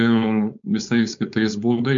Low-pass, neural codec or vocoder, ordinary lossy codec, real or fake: 7.2 kHz; codec, 16 kHz, 4.8 kbps, FACodec; MP3, 64 kbps; fake